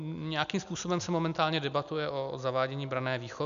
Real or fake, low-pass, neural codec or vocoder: real; 7.2 kHz; none